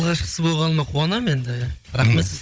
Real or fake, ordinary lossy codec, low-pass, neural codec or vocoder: fake; none; none; codec, 16 kHz, 16 kbps, FunCodec, trained on Chinese and English, 50 frames a second